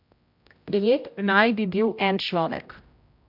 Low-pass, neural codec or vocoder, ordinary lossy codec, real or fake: 5.4 kHz; codec, 16 kHz, 0.5 kbps, X-Codec, HuBERT features, trained on general audio; MP3, 48 kbps; fake